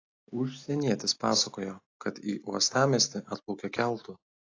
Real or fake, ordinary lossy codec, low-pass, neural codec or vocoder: real; AAC, 32 kbps; 7.2 kHz; none